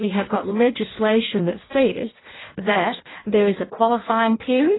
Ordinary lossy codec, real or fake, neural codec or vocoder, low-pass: AAC, 16 kbps; fake; codec, 16 kHz in and 24 kHz out, 0.6 kbps, FireRedTTS-2 codec; 7.2 kHz